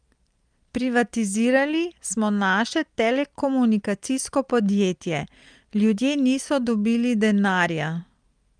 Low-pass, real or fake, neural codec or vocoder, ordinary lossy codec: 9.9 kHz; real; none; Opus, 32 kbps